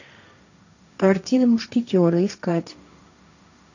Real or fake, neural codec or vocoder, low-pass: fake; codec, 16 kHz, 1.1 kbps, Voila-Tokenizer; 7.2 kHz